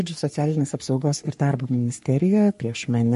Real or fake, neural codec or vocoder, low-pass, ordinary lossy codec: fake; codec, 44.1 kHz, 3.4 kbps, Pupu-Codec; 14.4 kHz; MP3, 48 kbps